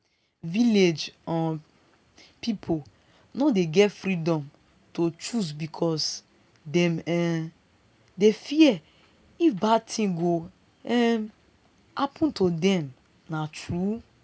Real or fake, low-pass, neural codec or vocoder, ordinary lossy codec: real; none; none; none